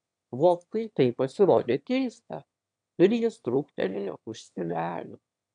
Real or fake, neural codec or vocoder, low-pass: fake; autoencoder, 22.05 kHz, a latent of 192 numbers a frame, VITS, trained on one speaker; 9.9 kHz